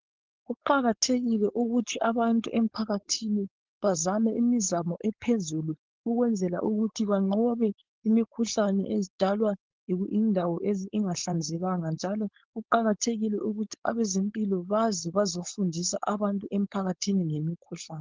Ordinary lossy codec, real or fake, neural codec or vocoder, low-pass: Opus, 16 kbps; fake; codec, 16 kHz, 4.8 kbps, FACodec; 7.2 kHz